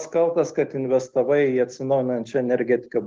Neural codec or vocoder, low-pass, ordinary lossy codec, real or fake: none; 7.2 kHz; Opus, 16 kbps; real